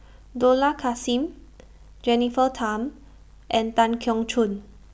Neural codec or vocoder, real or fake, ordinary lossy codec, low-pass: none; real; none; none